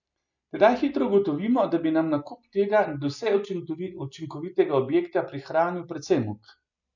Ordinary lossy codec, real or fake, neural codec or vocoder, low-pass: none; real; none; 7.2 kHz